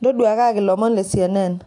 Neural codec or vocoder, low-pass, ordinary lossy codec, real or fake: none; 10.8 kHz; AAC, 64 kbps; real